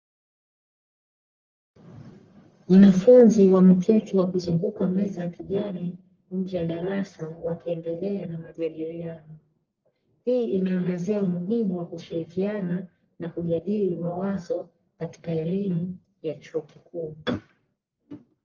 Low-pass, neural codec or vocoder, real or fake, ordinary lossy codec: 7.2 kHz; codec, 44.1 kHz, 1.7 kbps, Pupu-Codec; fake; Opus, 32 kbps